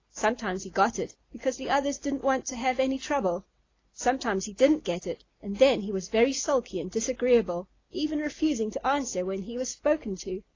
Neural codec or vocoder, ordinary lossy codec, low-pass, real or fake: none; AAC, 32 kbps; 7.2 kHz; real